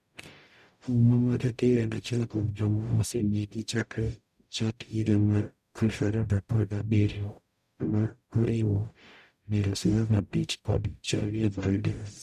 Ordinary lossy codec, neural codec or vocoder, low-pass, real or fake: none; codec, 44.1 kHz, 0.9 kbps, DAC; 14.4 kHz; fake